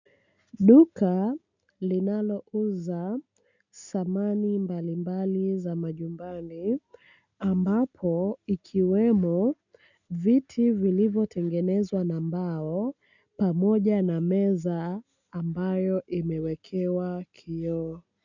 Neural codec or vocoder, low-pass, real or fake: none; 7.2 kHz; real